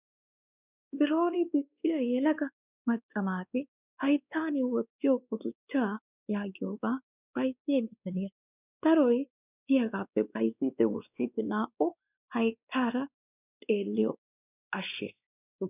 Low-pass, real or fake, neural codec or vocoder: 3.6 kHz; fake; codec, 16 kHz, 2 kbps, X-Codec, WavLM features, trained on Multilingual LibriSpeech